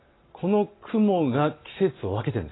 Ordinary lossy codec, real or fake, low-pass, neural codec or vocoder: AAC, 16 kbps; real; 7.2 kHz; none